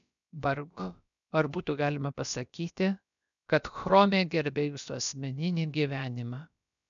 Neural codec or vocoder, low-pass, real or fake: codec, 16 kHz, about 1 kbps, DyCAST, with the encoder's durations; 7.2 kHz; fake